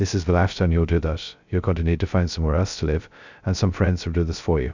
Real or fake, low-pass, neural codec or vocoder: fake; 7.2 kHz; codec, 16 kHz, 0.2 kbps, FocalCodec